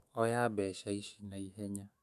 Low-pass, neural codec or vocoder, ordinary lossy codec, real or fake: 14.4 kHz; autoencoder, 48 kHz, 128 numbers a frame, DAC-VAE, trained on Japanese speech; none; fake